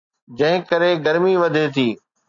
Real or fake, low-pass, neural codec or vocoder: real; 7.2 kHz; none